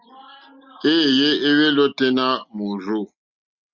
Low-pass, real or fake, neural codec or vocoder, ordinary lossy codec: 7.2 kHz; real; none; Opus, 64 kbps